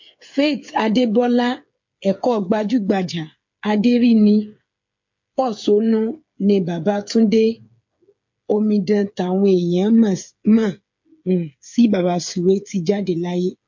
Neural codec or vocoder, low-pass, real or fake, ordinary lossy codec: codec, 16 kHz, 8 kbps, FreqCodec, smaller model; 7.2 kHz; fake; MP3, 48 kbps